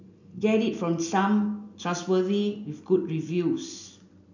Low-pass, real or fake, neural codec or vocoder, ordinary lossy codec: 7.2 kHz; real; none; none